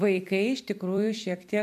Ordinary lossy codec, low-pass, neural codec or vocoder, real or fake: AAC, 96 kbps; 14.4 kHz; vocoder, 44.1 kHz, 128 mel bands every 256 samples, BigVGAN v2; fake